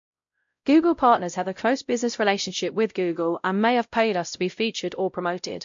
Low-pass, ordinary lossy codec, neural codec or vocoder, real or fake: 7.2 kHz; MP3, 48 kbps; codec, 16 kHz, 0.5 kbps, X-Codec, WavLM features, trained on Multilingual LibriSpeech; fake